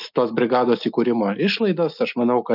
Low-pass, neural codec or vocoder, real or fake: 5.4 kHz; none; real